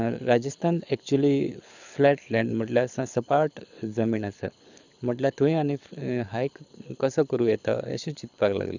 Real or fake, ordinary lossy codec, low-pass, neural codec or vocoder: fake; none; 7.2 kHz; codec, 24 kHz, 6 kbps, HILCodec